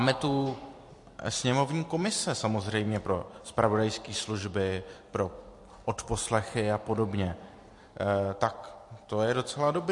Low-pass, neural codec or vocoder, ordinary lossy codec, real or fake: 10.8 kHz; vocoder, 44.1 kHz, 128 mel bands every 256 samples, BigVGAN v2; MP3, 48 kbps; fake